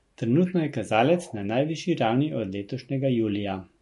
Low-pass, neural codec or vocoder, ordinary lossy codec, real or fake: 14.4 kHz; none; MP3, 48 kbps; real